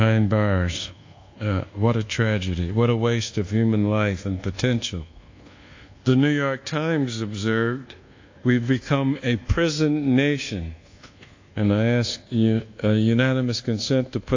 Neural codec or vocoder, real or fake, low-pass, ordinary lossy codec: codec, 24 kHz, 1.2 kbps, DualCodec; fake; 7.2 kHz; AAC, 48 kbps